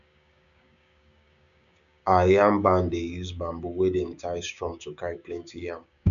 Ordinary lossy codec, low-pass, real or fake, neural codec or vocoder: none; 7.2 kHz; fake; codec, 16 kHz, 6 kbps, DAC